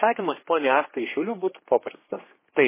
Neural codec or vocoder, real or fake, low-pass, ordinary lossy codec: codec, 16 kHz, 2 kbps, X-Codec, HuBERT features, trained on general audio; fake; 3.6 kHz; MP3, 16 kbps